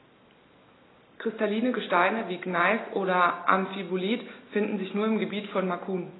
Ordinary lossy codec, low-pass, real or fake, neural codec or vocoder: AAC, 16 kbps; 7.2 kHz; real; none